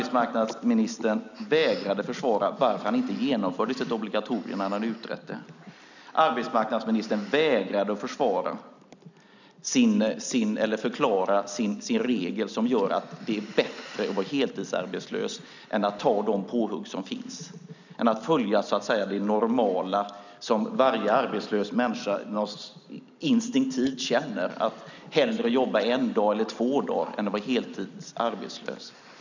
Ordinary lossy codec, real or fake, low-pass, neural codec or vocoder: none; real; 7.2 kHz; none